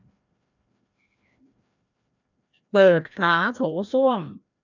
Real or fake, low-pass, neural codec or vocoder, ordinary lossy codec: fake; 7.2 kHz; codec, 16 kHz, 1 kbps, FreqCodec, larger model; none